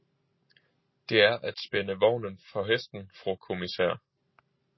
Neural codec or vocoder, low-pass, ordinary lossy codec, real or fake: none; 7.2 kHz; MP3, 24 kbps; real